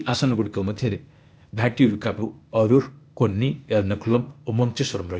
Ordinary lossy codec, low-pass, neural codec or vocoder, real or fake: none; none; codec, 16 kHz, 0.8 kbps, ZipCodec; fake